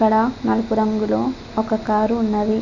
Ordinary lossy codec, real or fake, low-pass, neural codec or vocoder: none; real; 7.2 kHz; none